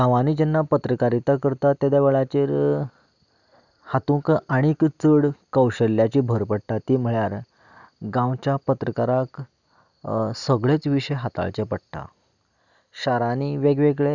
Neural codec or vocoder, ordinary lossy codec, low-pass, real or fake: none; none; 7.2 kHz; real